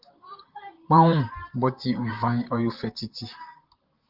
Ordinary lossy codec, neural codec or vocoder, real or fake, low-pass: Opus, 24 kbps; vocoder, 44.1 kHz, 128 mel bands, Pupu-Vocoder; fake; 5.4 kHz